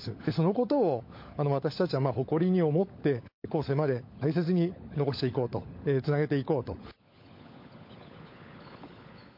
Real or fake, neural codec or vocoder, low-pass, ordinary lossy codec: fake; codec, 16 kHz, 16 kbps, FunCodec, trained on LibriTTS, 50 frames a second; 5.4 kHz; MP3, 32 kbps